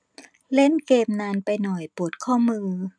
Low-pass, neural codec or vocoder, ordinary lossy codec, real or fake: 9.9 kHz; none; none; real